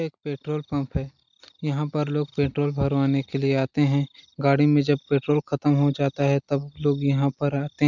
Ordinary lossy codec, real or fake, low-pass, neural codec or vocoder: none; real; 7.2 kHz; none